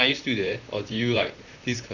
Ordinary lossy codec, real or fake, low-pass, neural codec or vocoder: none; fake; 7.2 kHz; vocoder, 44.1 kHz, 128 mel bands, Pupu-Vocoder